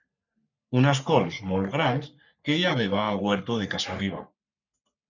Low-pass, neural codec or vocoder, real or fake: 7.2 kHz; codec, 44.1 kHz, 3.4 kbps, Pupu-Codec; fake